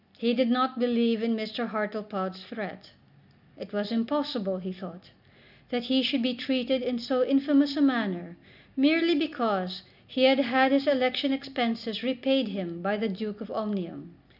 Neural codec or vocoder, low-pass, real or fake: none; 5.4 kHz; real